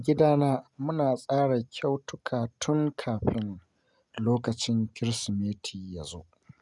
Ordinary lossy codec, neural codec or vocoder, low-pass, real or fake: none; none; 10.8 kHz; real